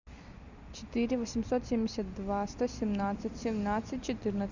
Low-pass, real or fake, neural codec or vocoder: 7.2 kHz; real; none